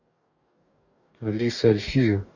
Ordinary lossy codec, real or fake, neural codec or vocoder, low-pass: none; fake; codec, 44.1 kHz, 2.6 kbps, DAC; 7.2 kHz